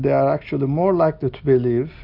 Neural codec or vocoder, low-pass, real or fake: none; 5.4 kHz; real